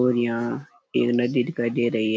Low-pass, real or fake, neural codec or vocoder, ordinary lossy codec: none; real; none; none